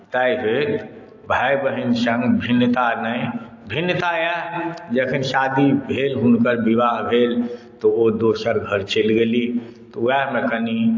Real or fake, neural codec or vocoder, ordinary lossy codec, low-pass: real; none; AAC, 48 kbps; 7.2 kHz